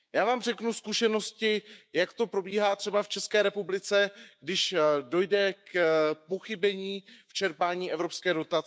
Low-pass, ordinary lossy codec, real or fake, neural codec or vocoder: none; none; fake; codec, 16 kHz, 6 kbps, DAC